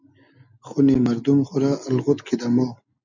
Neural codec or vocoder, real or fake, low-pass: none; real; 7.2 kHz